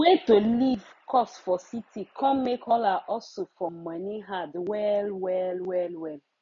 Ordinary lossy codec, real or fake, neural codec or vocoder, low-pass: MP3, 48 kbps; real; none; 7.2 kHz